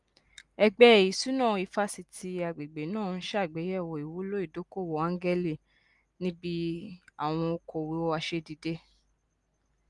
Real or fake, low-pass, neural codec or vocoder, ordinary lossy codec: real; 10.8 kHz; none; Opus, 32 kbps